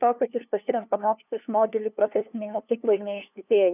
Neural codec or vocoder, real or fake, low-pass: codec, 16 kHz, 2 kbps, FunCodec, trained on LibriTTS, 25 frames a second; fake; 3.6 kHz